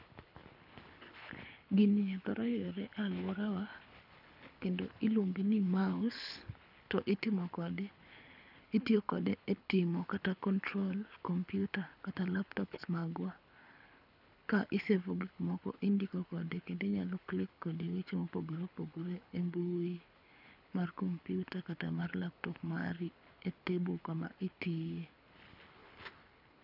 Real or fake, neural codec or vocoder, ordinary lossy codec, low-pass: fake; codec, 24 kHz, 6 kbps, HILCodec; none; 5.4 kHz